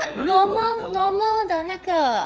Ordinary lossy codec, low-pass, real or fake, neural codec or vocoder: none; none; fake; codec, 16 kHz, 4 kbps, FreqCodec, larger model